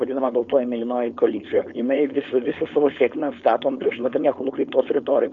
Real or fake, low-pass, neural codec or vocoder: fake; 7.2 kHz; codec, 16 kHz, 4.8 kbps, FACodec